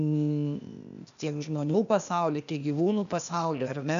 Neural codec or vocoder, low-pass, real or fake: codec, 16 kHz, 0.8 kbps, ZipCodec; 7.2 kHz; fake